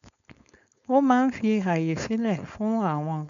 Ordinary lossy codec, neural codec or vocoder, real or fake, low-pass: none; codec, 16 kHz, 4.8 kbps, FACodec; fake; 7.2 kHz